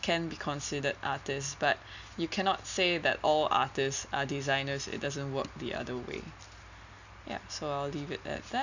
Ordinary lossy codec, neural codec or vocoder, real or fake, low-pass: none; none; real; 7.2 kHz